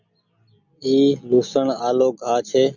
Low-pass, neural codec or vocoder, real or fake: 7.2 kHz; none; real